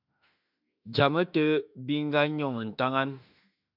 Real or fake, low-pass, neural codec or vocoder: fake; 5.4 kHz; autoencoder, 48 kHz, 32 numbers a frame, DAC-VAE, trained on Japanese speech